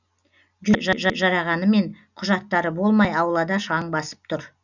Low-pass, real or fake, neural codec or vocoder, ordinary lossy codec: 7.2 kHz; real; none; none